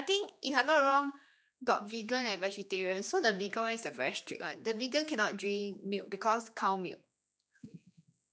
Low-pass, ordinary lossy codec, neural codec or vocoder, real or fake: none; none; codec, 16 kHz, 2 kbps, X-Codec, HuBERT features, trained on general audio; fake